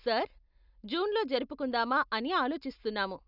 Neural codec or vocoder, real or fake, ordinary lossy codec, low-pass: none; real; none; 5.4 kHz